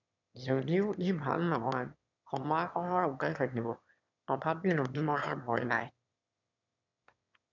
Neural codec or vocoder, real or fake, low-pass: autoencoder, 22.05 kHz, a latent of 192 numbers a frame, VITS, trained on one speaker; fake; 7.2 kHz